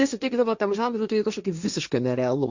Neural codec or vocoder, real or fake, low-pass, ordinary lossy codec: codec, 16 kHz, 1.1 kbps, Voila-Tokenizer; fake; 7.2 kHz; Opus, 64 kbps